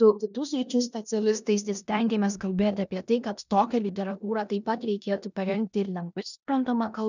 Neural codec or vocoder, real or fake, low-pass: codec, 16 kHz in and 24 kHz out, 0.9 kbps, LongCat-Audio-Codec, four codebook decoder; fake; 7.2 kHz